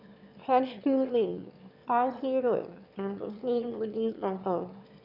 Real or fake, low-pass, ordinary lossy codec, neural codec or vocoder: fake; 5.4 kHz; none; autoencoder, 22.05 kHz, a latent of 192 numbers a frame, VITS, trained on one speaker